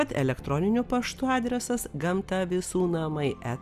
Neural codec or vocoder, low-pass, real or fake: none; 14.4 kHz; real